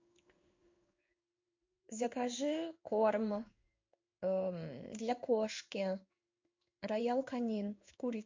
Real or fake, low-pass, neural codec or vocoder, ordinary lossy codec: fake; 7.2 kHz; codec, 16 kHz in and 24 kHz out, 1 kbps, XY-Tokenizer; MP3, 48 kbps